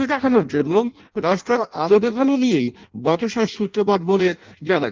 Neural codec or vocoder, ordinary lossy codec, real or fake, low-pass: codec, 16 kHz in and 24 kHz out, 0.6 kbps, FireRedTTS-2 codec; Opus, 32 kbps; fake; 7.2 kHz